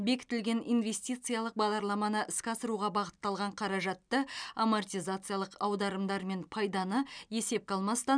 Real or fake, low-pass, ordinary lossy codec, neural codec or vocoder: real; 9.9 kHz; none; none